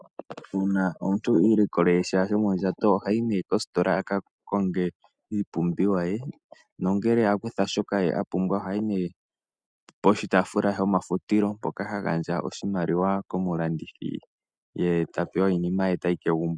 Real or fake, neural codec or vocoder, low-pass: real; none; 9.9 kHz